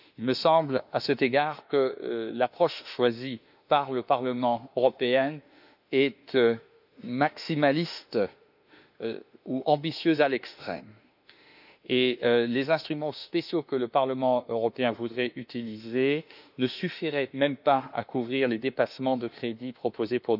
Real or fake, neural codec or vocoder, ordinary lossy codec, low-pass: fake; autoencoder, 48 kHz, 32 numbers a frame, DAC-VAE, trained on Japanese speech; none; 5.4 kHz